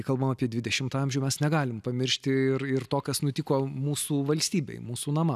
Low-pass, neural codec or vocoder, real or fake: 14.4 kHz; none; real